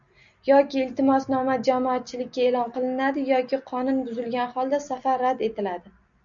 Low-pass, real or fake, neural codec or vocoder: 7.2 kHz; real; none